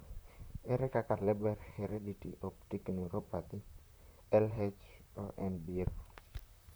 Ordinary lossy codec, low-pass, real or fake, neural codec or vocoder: none; none; fake; vocoder, 44.1 kHz, 128 mel bands, Pupu-Vocoder